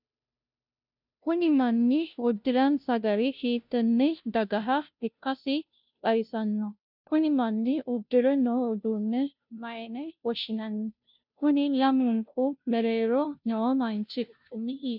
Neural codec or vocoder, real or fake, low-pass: codec, 16 kHz, 0.5 kbps, FunCodec, trained on Chinese and English, 25 frames a second; fake; 5.4 kHz